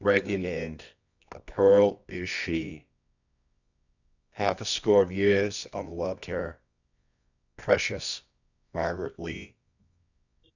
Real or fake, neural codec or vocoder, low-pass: fake; codec, 24 kHz, 0.9 kbps, WavTokenizer, medium music audio release; 7.2 kHz